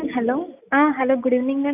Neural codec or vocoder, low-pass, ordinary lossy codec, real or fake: none; 3.6 kHz; none; real